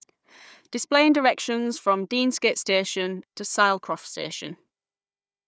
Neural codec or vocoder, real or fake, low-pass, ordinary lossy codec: codec, 16 kHz, 4 kbps, FunCodec, trained on Chinese and English, 50 frames a second; fake; none; none